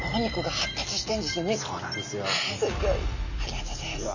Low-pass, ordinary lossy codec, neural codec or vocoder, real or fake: 7.2 kHz; none; none; real